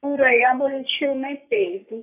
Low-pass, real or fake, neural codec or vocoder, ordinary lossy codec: 3.6 kHz; fake; codec, 44.1 kHz, 3.4 kbps, Pupu-Codec; none